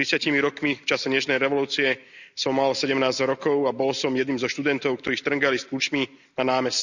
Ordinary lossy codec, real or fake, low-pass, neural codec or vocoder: none; real; 7.2 kHz; none